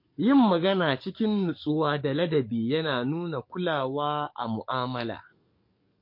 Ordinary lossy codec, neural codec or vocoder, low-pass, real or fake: MP3, 32 kbps; codec, 44.1 kHz, 7.8 kbps, DAC; 5.4 kHz; fake